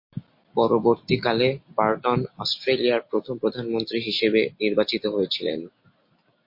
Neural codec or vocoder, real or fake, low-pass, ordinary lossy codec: none; real; 5.4 kHz; MP3, 32 kbps